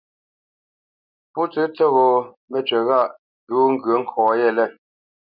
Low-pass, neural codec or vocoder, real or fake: 5.4 kHz; none; real